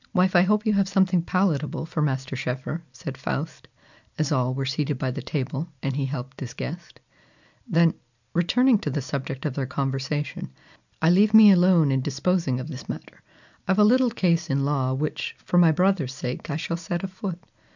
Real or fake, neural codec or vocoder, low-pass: real; none; 7.2 kHz